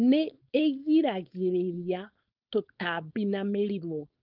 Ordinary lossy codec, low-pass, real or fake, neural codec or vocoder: Opus, 24 kbps; 5.4 kHz; fake; codec, 16 kHz, 4.8 kbps, FACodec